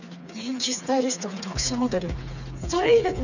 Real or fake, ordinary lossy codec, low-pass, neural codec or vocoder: fake; none; 7.2 kHz; codec, 16 kHz, 4 kbps, FreqCodec, smaller model